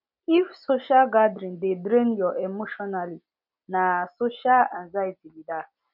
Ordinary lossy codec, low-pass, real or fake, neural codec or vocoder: none; 5.4 kHz; real; none